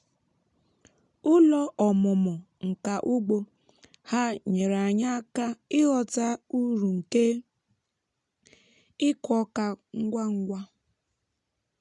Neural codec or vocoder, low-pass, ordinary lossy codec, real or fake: none; 10.8 kHz; none; real